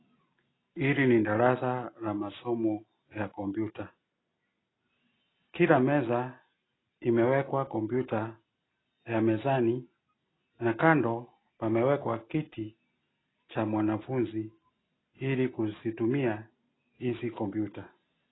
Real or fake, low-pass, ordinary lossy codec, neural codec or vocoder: real; 7.2 kHz; AAC, 16 kbps; none